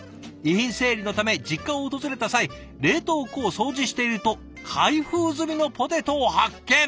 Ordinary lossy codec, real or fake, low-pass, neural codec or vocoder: none; real; none; none